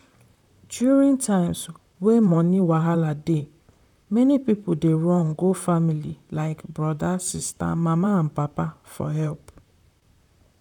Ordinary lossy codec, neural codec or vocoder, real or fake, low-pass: none; vocoder, 44.1 kHz, 128 mel bands, Pupu-Vocoder; fake; 19.8 kHz